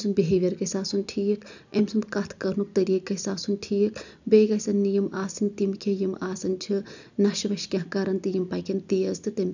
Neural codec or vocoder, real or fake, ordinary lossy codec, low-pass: none; real; none; 7.2 kHz